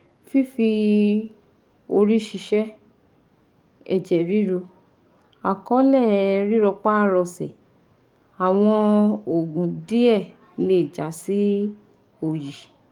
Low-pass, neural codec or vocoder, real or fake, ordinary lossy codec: 19.8 kHz; codec, 44.1 kHz, 7.8 kbps, DAC; fake; Opus, 32 kbps